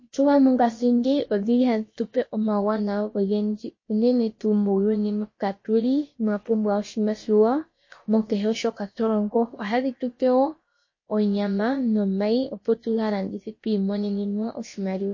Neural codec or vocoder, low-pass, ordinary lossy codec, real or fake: codec, 16 kHz, about 1 kbps, DyCAST, with the encoder's durations; 7.2 kHz; MP3, 32 kbps; fake